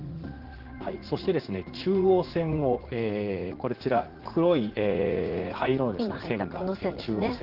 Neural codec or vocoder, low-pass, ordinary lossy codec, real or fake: vocoder, 44.1 kHz, 80 mel bands, Vocos; 5.4 kHz; Opus, 16 kbps; fake